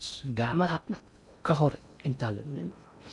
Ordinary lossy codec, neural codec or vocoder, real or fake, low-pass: none; codec, 16 kHz in and 24 kHz out, 0.6 kbps, FocalCodec, streaming, 4096 codes; fake; 10.8 kHz